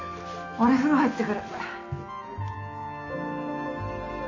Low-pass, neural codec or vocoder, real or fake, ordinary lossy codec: 7.2 kHz; none; real; AAC, 48 kbps